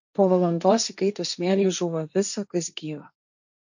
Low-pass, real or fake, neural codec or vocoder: 7.2 kHz; fake; codec, 16 kHz, 1.1 kbps, Voila-Tokenizer